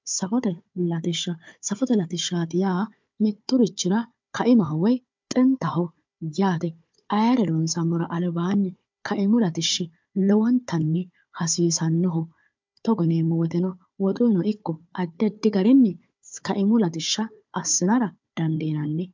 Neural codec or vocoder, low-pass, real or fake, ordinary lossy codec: codec, 16 kHz, 4 kbps, FunCodec, trained on Chinese and English, 50 frames a second; 7.2 kHz; fake; MP3, 64 kbps